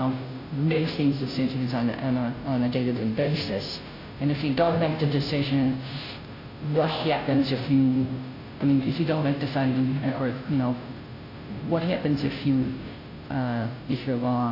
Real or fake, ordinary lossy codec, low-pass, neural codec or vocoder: fake; AAC, 32 kbps; 5.4 kHz; codec, 16 kHz, 0.5 kbps, FunCodec, trained on Chinese and English, 25 frames a second